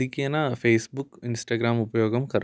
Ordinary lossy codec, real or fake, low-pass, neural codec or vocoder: none; real; none; none